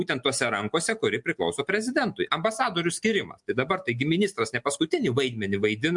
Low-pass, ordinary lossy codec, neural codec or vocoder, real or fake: 10.8 kHz; MP3, 64 kbps; none; real